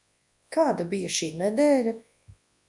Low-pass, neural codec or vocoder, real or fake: 10.8 kHz; codec, 24 kHz, 0.9 kbps, WavTokenizer, large speech release; fake